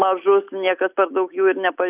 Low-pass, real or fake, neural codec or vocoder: 3.6 kHz; real; none